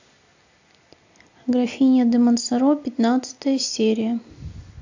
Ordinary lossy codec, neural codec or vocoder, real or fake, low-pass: AAC, 48 kbps; none; real; 7.2 kHz